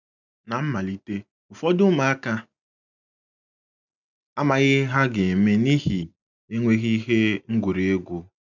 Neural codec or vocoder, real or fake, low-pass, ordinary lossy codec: none; real; 7.2 kHz; none